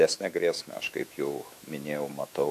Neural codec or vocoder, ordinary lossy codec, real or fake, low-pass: autoencoder, 48 kHz, 128 numbers a frame, DAC-VAE, trained on Japanese speech; AAC, 96 kbps; fake; 14.4 kHz